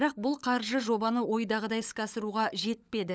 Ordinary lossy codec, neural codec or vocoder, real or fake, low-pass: none; codec, 16 kHz, 16 kbps, FunCodec, trained on LibriTTS, 50 frames a second; fake; none